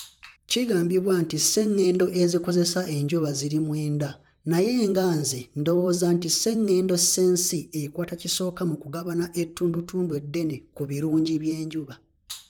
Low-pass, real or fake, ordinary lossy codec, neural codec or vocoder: 19.8 kHz; fake; none; vocoder, 44.1 kHz, 128 mel bands, Pupu-Vocoder